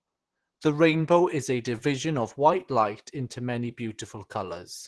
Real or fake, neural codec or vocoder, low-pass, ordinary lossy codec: fake; vocoder, 44.1 kHz, 128 mel bands, Pupu-Vocoder; 10.8 kHz; Opus, 16 kbps